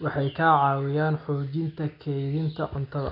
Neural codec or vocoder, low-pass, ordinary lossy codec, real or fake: none; 5.4 kHz; none; real